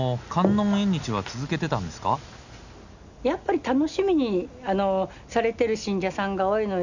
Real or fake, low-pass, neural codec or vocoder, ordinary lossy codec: real; 7.2 kHz; none; none